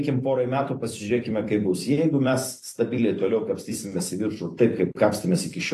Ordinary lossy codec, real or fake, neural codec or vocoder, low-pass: AAC, 48 kbps; fake; vocoder, 44.1 kHz, 128 mel bands every 256 samples, BigVGAN v2; 14.4 kHz